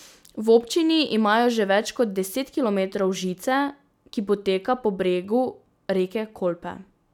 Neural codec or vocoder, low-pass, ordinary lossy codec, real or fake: none; 19.8 kHz; none; real